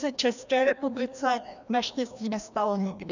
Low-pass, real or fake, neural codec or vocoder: 7.2 kHz; fake; codec, 16 kHz, 1 kbps, FreqCodec, larger model